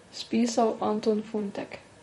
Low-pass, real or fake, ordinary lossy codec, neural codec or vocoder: 19.8 kHz; fake; MP3, 48 kbps; vocoder, 44.1 kHz, 128 mel bands, Pupu-Vocoder